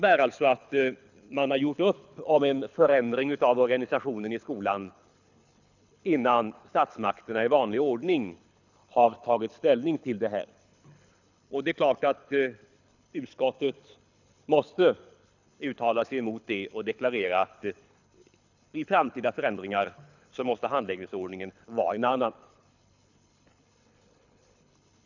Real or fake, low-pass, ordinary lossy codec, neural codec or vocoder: fake; 7.2 kHz; none; codec, 24 kHz, 6 kbps, HILCodec